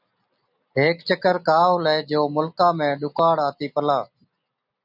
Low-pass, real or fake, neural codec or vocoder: 5.4 kHz; real; none